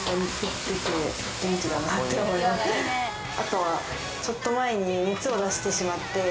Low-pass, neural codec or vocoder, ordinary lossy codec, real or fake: none; none; none; real